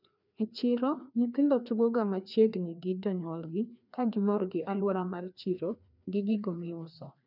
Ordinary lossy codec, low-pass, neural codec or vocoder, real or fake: none; 5.4 kHz; codec, 16 kHz, 2 kbps, FreqCodec, larger model; fake